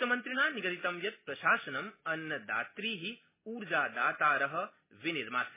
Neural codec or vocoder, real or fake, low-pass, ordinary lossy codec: none; real; 3.6 kHz; MP3, 16 kbps